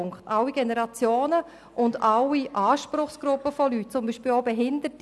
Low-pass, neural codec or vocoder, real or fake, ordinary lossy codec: none; none; real; none